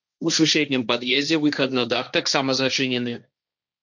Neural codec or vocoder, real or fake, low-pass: codec, 16 kHz, 1.1 kbps, Voila-Tokenizer; fake; 7.2 kHz